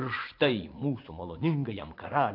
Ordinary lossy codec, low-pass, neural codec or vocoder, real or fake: AAC, 32 kbps; 5.4 kHz; vocoder, 24 kHz, 100 mel bands, Vocos; fake